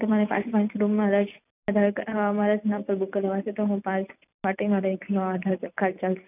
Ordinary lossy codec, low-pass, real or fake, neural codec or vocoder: none; 3.6 kHz; real; none